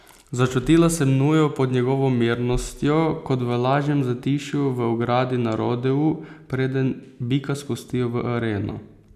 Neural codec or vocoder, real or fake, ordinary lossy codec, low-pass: none; real; none; 14.4 kHz